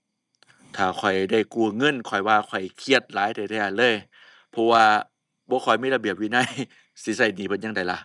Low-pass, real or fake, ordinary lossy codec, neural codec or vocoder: 10.8 kHz; real; none; none